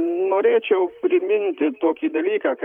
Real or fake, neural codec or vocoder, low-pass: fake; vocoder, 44.1 kHz, 128 mel bands, Pupu-Vocoder; 19.8 kHz